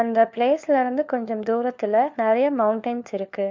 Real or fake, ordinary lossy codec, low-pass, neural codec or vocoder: fake; MP3, 64 kbps; 7.2 kHz; codec, 16 kHz, 4 kbps, FunCodec, trained on LibriTTS, 50 frames a second